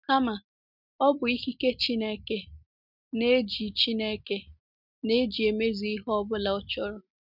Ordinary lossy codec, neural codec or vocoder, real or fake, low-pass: none; none; real; 5.4 kHz